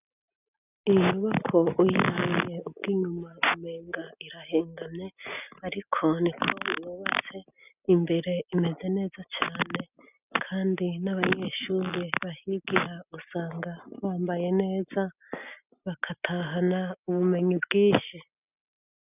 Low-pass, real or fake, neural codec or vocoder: 3.6 kHz; real; none